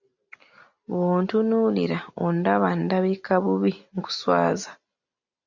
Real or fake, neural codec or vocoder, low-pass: real; none; 7.2 kHz